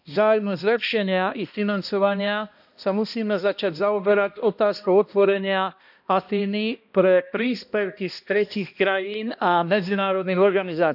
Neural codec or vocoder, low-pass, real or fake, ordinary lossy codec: codec, 16 kHz, 1 kbps, X-Codec, HuBERT features, trained on balanced general audio; 5.4 kHz; fake; none